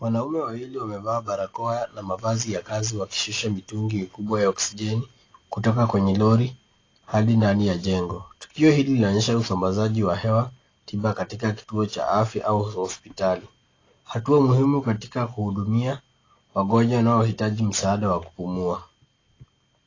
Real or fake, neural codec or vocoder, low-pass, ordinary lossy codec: real; none; 7.2 kHz; AAC, 32 kbps